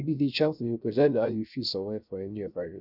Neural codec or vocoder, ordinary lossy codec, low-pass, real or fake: codec, 16 kHz, 0.3 kbps, FocalCodec; none; 5.4 kHz; fake